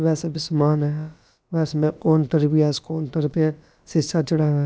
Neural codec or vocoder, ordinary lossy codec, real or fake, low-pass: codec, 16 kHz, about 1 kbps, DyCAST, with the encoder's durations; none; fake; none